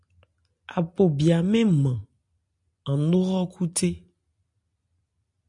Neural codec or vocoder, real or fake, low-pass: none; real; 10.8 kHz